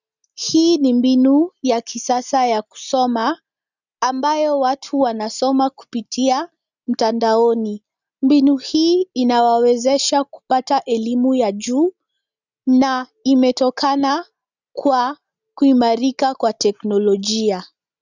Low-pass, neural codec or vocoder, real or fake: 7.2 kHz; none; real